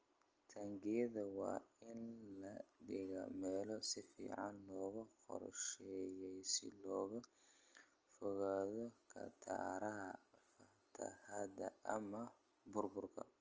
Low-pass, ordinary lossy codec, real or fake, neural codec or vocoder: 7.2 kHz; Opus, 24 kbps; real; none